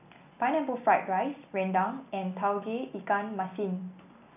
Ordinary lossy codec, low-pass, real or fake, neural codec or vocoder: none; 3.6 kHz; real; none